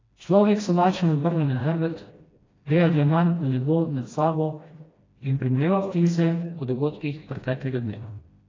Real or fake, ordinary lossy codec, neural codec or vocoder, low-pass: fake; AAC, 32 kbps; codec, 16 kHz, 1 kbps, FreqCodec, smaller model; 7.2 kHz